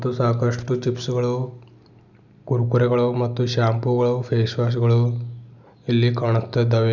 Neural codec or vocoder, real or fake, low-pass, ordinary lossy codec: none; real; 7.2 kHz; none